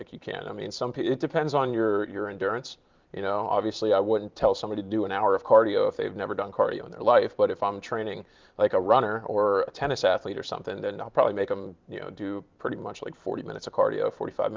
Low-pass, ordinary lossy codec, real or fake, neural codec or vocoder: 7.2 kHz; Opus, 32 kbps; fake; vocoder, 44.1 kHz, 128 mel bands every 512 samples, BigVGAN v2